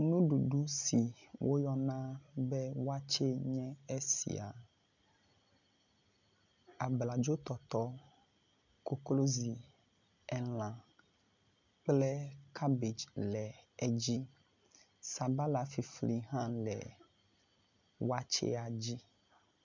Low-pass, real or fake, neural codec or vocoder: 7.2 kHz; real; none